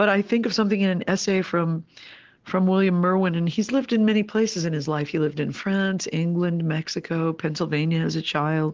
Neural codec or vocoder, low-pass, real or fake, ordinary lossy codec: none; 7.2 kHz; real; Opus, 16 kbps